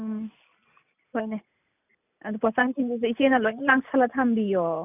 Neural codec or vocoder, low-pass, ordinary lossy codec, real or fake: none; 3.6 kHz; none; real